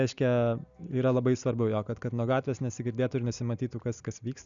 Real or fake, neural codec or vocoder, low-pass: real; none; 7.2 kHz